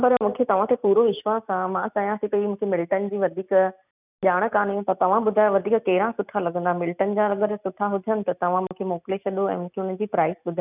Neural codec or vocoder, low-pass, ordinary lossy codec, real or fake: none; 3.6 kHz; none; real